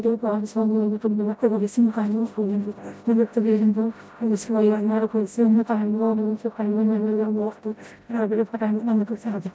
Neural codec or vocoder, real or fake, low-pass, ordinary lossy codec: codec, 16 kHz, 0.5 kbps, FreqCodec, smaller model; fake; none; none